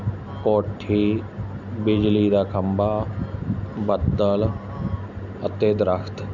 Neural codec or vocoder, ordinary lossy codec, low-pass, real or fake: none; none; 7.2 kHz; real